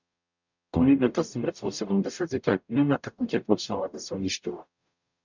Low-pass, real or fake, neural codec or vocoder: 7.2 kHz; fake; codec, 44.1 kHz, 0.9 kbps, DAC